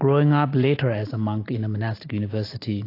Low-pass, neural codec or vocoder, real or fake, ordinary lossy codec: 5.4 kHz; none; real; AAC, 32 kbps